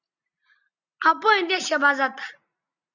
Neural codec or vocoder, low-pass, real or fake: none; 7.2 kHz; real